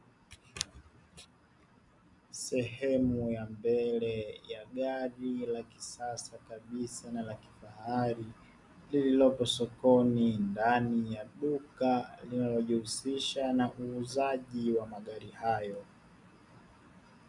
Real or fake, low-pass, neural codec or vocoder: real; 10.8 kHz; none